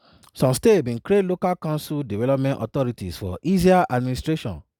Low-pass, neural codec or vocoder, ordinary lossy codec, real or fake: 19.8 kHz; none; none; real